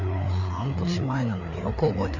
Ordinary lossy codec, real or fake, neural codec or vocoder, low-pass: none; fake; codec, 16 kHz, 4 kbps, FreqCodec, larger model; 7.2 kHz